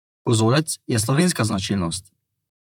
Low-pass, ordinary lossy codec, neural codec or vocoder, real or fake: 19.8 kHz; none; vocoder, 44.1 kHz, 128 mel bands, Pupu-Vocoder; fake